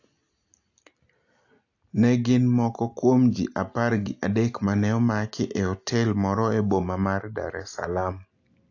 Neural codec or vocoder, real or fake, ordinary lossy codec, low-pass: none; real; AAC, 48 kbps; 7.2 kHz